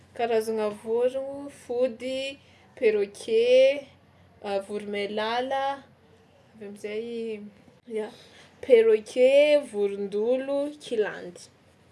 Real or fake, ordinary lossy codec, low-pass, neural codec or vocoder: real; none; none; none